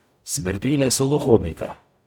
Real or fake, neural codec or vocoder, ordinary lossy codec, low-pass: fake; codec, 44.1 kHz, 0.9 kbps, DAC; none; 19.8 kHz